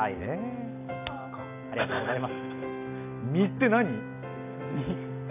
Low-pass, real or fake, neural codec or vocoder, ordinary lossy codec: 3.6 kHz; real; none; none